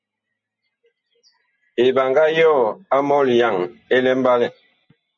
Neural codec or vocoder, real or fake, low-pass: none; real; 7.2 kHz